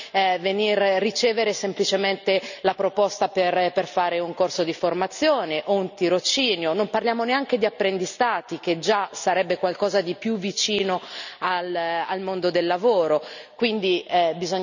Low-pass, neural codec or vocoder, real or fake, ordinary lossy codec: 7.2 kHz; none; real; none